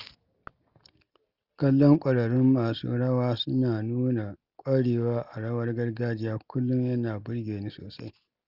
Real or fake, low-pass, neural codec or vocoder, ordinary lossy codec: real; 5.4 kHz; none; Opus, 24 kbps